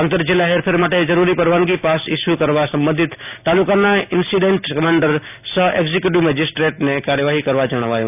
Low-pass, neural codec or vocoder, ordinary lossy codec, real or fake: 3.6 kHz; none; none; real